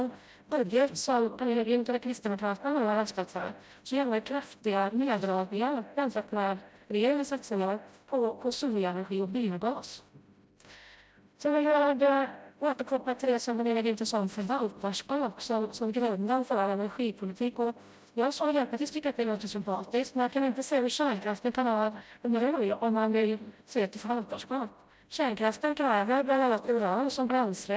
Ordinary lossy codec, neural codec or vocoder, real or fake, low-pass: none; codec, 16 kHz, 0.5 kbps, FreqCodec, smaller model; fake; none